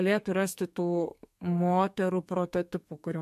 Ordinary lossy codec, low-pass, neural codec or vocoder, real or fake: MP3, 64 kbps; 14.4 kHz; codec, 32 kHz, 1.9 kbps, SNAC; fake